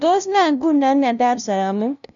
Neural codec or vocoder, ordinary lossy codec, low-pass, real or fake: codec, 16 kHz, 0.5 kbps, FunCodec, trained on LibriTTS, 25 frames a second; none; 7.2 kHz; fake